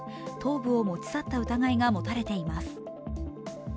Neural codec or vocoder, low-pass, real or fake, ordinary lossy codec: none; none; real; none